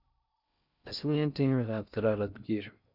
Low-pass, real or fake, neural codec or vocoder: 5.4 kHz; fake; codec, 16 kHz in and 24 kHz out, 0.6 kbps, FocalCodec, streaming, 4096 codes